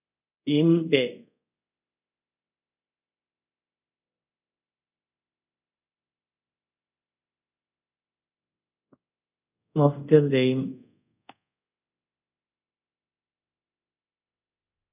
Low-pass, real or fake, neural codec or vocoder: 3.6 kHz; fake; codec, 24 kHz, 0.5 kbps, DualCodec